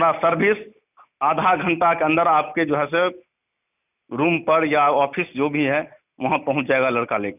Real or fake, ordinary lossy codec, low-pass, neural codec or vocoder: real; none; 3.6 kHz; none